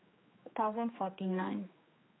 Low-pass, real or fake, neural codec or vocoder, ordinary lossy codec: 7.2 kHz; fake; codec, 16 kHz, 2 kbps, X-Codec, HuBERT features, trained on general audio; AAC, 16 kbps